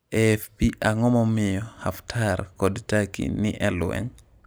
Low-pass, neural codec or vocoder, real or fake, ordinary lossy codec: none; none; real; none